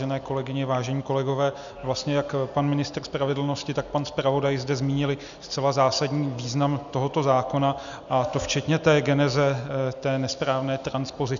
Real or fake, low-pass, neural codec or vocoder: real; 7.2 kHz; none